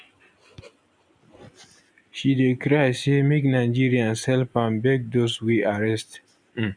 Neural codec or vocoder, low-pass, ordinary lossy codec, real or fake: none; 9.9 kHz; none; real